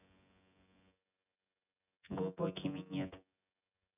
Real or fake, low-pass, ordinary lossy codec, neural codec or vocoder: fake; 3.6 kHz; none; vocoder, 24 kHz, 100 mel bands, Vocos